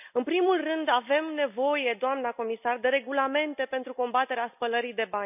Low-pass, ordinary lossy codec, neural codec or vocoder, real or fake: 3.6 kHz; none; none; real